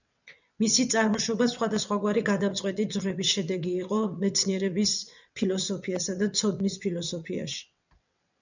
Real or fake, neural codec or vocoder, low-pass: fake; vocoder, 22.05 kHz, 80 mel bands, WaveNeXt; 7.2 kHz